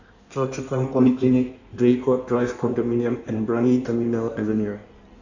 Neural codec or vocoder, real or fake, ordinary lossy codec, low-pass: codec, 16 kHz in and 24 kHz out, 1.1 kbps, FireRedTTS-2 codec; fake; none; 7.2 kHz